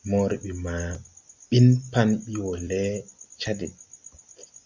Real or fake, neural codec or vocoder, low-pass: real; none; 7.2 kHz